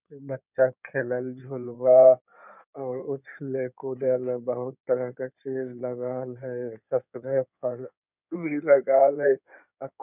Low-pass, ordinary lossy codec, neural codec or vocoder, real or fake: 3.6 kHz; none; codec, 16 kHz in and 24 kHz out, 2.2 kbps, FireRedTTS-2 codec; fake